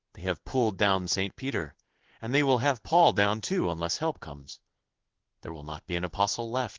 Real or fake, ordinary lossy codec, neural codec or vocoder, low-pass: fake; Opus, 16 kbps; codec, 16 kHz in and 24 kHz out, 1 kbps, XY-Tokenizer; 7.2 kHz